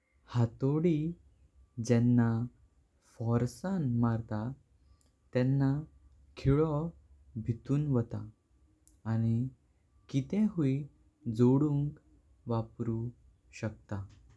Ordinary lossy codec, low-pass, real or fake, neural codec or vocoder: none; none; real; none